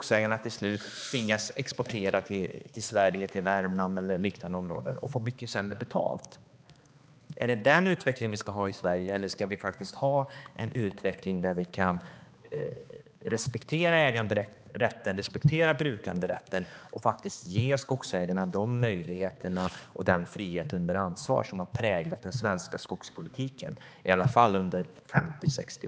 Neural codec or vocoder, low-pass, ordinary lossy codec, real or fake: codec, 16 kHz, 2 kbps, X-Codec, HuBERT features, trained on balanced general audio; none; none; fake